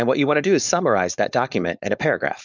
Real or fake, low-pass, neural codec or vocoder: fake; 7.2 kHz; codec, 16 kHz, 4.8 kbps, FACodec